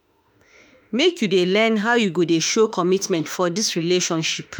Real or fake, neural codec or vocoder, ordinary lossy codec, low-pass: fake; autoencoder, 48 kHz, 32 numbers a frame, DAC-VAE, trained on Japanese speech; none; none